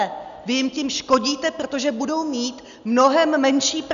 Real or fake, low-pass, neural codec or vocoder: real; 7.2 kHz; none